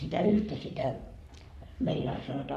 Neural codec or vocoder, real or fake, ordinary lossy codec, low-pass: codec, 44.1 kHz, 3.4 kbps, Pupu-Codec; fake; none; 14.4 kHz